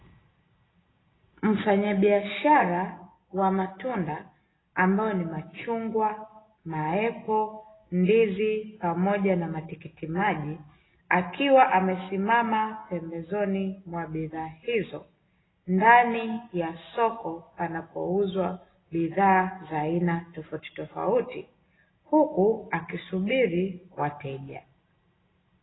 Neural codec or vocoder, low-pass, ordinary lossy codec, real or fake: none; 7.2 kHz; AAC, 16 kbps; real